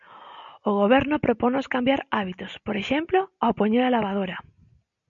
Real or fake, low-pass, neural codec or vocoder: real; 7.2 kHz; none